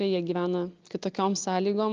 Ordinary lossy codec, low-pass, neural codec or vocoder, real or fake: Opus, 24 kbps; 7.2 kHz; none; real